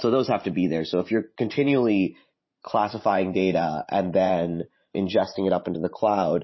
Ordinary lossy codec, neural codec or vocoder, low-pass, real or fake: MP3, 24 kbps; vocoder, 44.1 kHz, 128 mel bands every 512 samples, BigVGAN v2; 7.2 kHz; fake